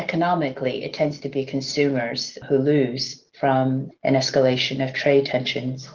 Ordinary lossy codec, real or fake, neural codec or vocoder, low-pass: Opus, 16 kbps; real; none; 7.2 kHz